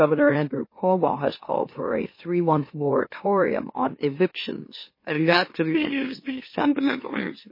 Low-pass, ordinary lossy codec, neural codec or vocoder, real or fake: 5.4 kHz; MP3, 24 kbps; autoencoder, 44.1 kHz, a latent of 192 numbers a frame, MeloTTS; fake